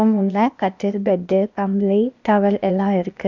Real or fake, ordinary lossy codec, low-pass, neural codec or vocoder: fake; none; 7.2 kHz; codec, 16 kHz, 0.8 kbps, ZipCodec